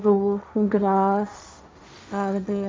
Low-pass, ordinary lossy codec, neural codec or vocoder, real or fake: none; none; codec, 16 kHz, 1.1 kbps, Voila-Tokenizer; fake